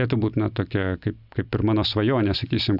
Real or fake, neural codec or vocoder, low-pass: real; none; 5.4 kHz